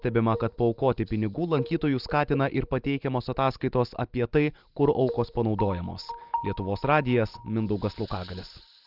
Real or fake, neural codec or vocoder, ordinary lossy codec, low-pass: real; none; Opus, 32 kbps; 5.4 kHz